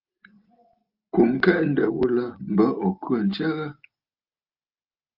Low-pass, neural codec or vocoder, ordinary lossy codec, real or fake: 5.4 kHz; none; Opus, 24 kbps; real